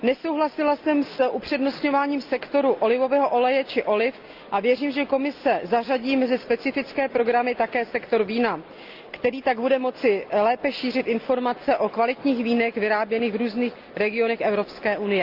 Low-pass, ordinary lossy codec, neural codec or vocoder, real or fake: 5.4 kHz; Opus, 24 kbps; none; real